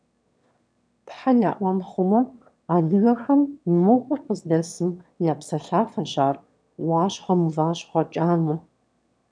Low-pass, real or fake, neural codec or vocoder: 9.9 kHz; fake; autoencoder, 22.05 kHz, a latent of 192 numbers a frame, VITS, trained on one speaker